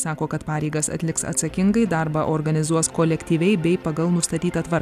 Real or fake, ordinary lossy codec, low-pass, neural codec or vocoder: real; AAC, 96 kbps; 14.4 kHz; none